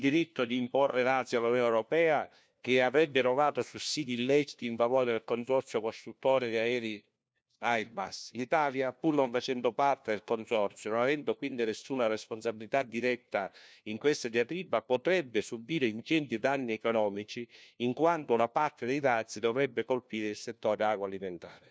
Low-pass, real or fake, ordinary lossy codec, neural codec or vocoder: none; fake; none; codec, 16 kHz, 1 kbps, FunCodec, trained on LibriTTS, 50 frames a second